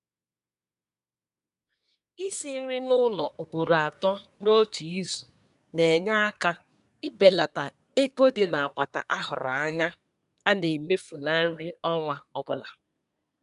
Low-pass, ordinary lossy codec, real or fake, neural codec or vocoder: 10.8 kHz; none; fake; codec, 24 kHz, 1 kbps, SNAC